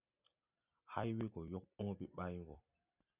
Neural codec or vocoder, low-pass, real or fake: none; 3.6 kHz; real